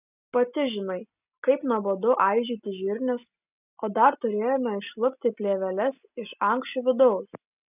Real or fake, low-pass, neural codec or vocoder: real; 3.6 kHz; none